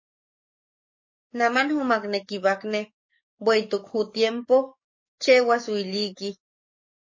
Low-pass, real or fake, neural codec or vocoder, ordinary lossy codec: 7.2 kHz; fake; codec, 44.1 kHz, 7.8 kbps, DAC; MP3, 32 kbps